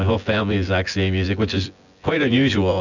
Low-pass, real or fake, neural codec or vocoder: 7.2 kHz; fake; vocoder, 24 kHz, 100 mel bands, Vocos